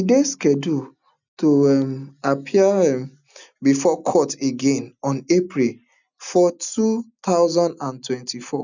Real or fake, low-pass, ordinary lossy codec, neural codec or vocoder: real; 7.2 kHz; none; none